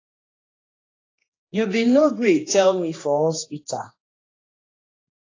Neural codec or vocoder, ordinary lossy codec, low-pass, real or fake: codec, 16 kHz, 2 kbps, X-Codec, HuBERT features, trained on general audio; AAC, 32 kbps; 7.2 kHz; fake